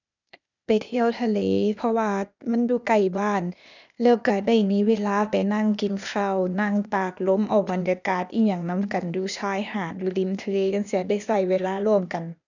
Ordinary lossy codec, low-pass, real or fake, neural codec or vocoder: none; 7.2 kHz; fake; codec, 16 kHz, 0.8 kbps, ZipCodec